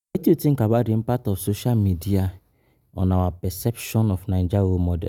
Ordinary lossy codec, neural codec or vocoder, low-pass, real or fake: none; none; 19.8 kHz; real